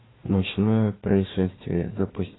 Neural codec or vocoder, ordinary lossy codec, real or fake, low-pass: codec, 16 kHz, 1 kbps, FunCodec, trained on Chinese and English, 50 frames a second; AAC, 16 kbps; fake; 7.2 kHz